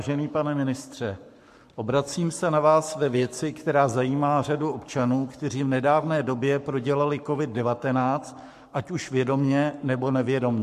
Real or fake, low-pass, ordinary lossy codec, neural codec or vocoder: fake; 14.4 kHz; MP3, 64 kbps; codec, 44.1 kHz, 7.8 kbps, Pupu-Codec